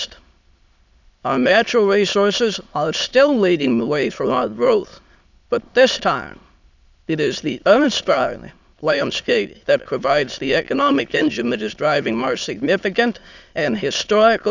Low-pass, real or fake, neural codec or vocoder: 7.2 kHz; fake; autoencoder, 22.05 kHz, a latent of 192 numbers a frame, VITS, trained on many speakers